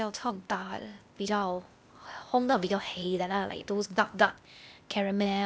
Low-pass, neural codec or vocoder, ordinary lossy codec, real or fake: none; codec, 16 kHz, 0.8 kbps, ZipCodec; none; fake